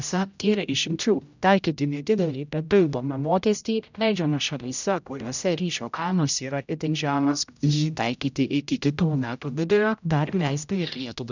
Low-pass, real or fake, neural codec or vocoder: 7.2 kHz; fake; codec, 16 kHz, 0.5 kbps, X-Codec, HuBERT features, trained on general audio